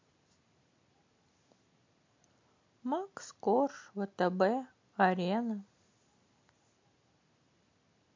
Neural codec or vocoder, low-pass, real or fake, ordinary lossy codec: none; 7.2 kHz; real; MP3, 48 kbps